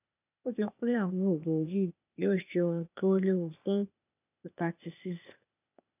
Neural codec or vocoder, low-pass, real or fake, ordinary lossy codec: codec, 16 kHz, 0.8 kbps, ZipCodec; 3.6 kHz; fake; none